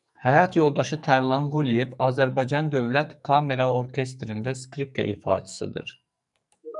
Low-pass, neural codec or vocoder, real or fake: 10.8 kHz; codec, 44.1 kHz, 2.6 kbps, SNAC; fake